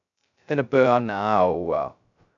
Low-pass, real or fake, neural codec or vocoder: 7.2 kHz; fake; codec, 16 kHz, 0.2 kbps, FocalCodec